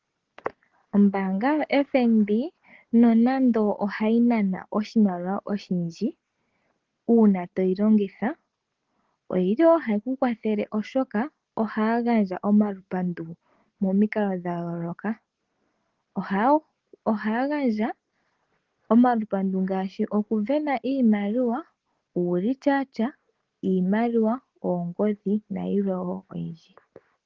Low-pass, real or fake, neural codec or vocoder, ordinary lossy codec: 7.2 kHz; real; none; Opus, 16 kbps